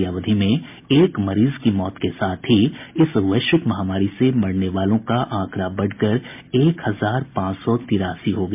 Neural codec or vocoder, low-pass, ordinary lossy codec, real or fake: none; 3.6 kHz; MP3, 32 kbps; real